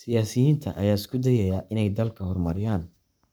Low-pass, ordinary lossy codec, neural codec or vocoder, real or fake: none; none; codec, 44.1 kHz, 7.8 kbps, Pupu-Codec; fake